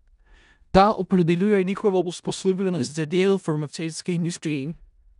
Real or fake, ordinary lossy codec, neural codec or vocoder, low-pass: fake; none; codec, 16 kHz in and 24 kHz out, 0.4 kbps, LongCat-Audio-Codec, four codebook decoder; 10.8 kHz